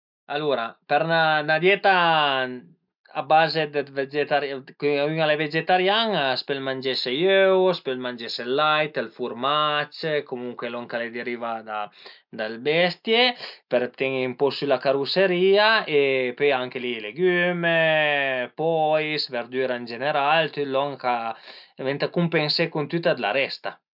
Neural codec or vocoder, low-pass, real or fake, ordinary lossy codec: none; 5.4 kHz; real; none